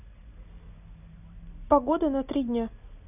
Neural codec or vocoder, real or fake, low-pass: none; real; 3.6 kHz